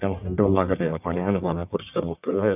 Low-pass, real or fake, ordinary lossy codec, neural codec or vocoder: 3.6 kHz; fake; none; codec, 44.1 kHz, 1.7 kbps, Pupu-Codec